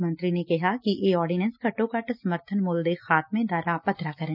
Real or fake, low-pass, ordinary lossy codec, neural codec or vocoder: real; 5.4 kHz; none; none